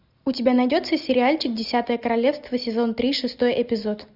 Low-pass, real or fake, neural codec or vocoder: 5.4 kHz; real; none